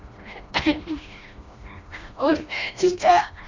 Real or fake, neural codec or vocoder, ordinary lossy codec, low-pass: fake; codec, 16 kHz, 1 kbps, FreqCodec, smaller model; MP3, 64 kbps; 7.2 kHz